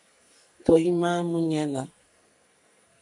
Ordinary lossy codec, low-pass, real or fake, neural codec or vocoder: MP3, 64 kbps; 10.8 kHz; fake; codec, 44.1 kHz, 2.6 kbps, SNAC